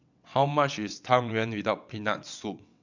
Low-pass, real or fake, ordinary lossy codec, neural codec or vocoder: 7.2 kHz; fake; none; vocoder, 22.05 kHz, 80 mel bands, WaveNeXt